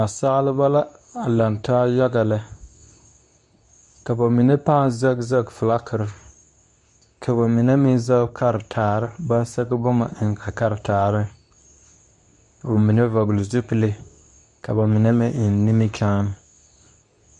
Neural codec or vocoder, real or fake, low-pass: codec, 24 kHz, 0.9 kbps, WavTokenizer, medium speech release version 2; fake; 10.8 kHz